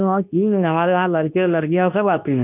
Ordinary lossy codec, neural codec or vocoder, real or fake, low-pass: none; codec, 24 kHz, 0.9 kbps, WavTokenizer, medium speech release version 2; fake; 3.6 kHz